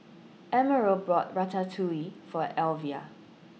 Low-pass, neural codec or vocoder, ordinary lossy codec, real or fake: none; none; none; real